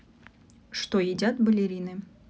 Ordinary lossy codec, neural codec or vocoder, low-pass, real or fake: none; none; none; real